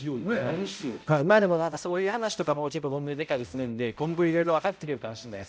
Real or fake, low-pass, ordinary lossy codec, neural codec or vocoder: fake; none; none; codec, 16 kHz, 0.5 kbps, X-Codec, HuBERT features, trained on balanced general audio